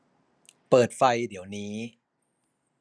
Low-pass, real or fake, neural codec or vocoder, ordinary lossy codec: none; real; none; none